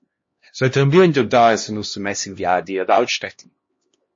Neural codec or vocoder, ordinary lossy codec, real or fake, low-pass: codec, 16 kHz, 1 kbps, X-Codec, HuBERT features, trained on LibriSpeech; MP3, 32 kbps; fake; 7.2 kHz